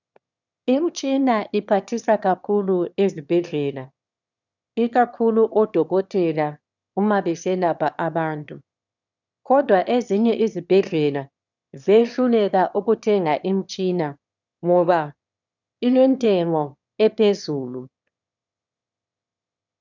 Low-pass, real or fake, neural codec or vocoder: 7.2 kHz; fake; autoencoder, 22.05 kHz, a latent of 192 numbers a frame, VITS, trained on one speaker